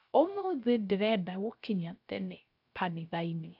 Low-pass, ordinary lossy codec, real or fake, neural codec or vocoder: 5.4 kHz; AAC, 48 kbps; fake; codec, 16 kHz, 0.3 kbps, FocalCodec